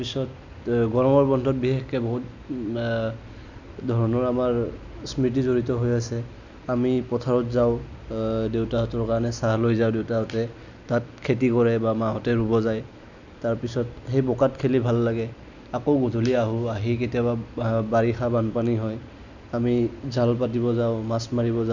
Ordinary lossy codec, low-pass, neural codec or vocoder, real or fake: none; 7.2 kHz; none; real